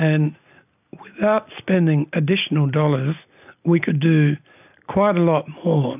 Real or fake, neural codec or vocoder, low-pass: real; none; 3.6 kHz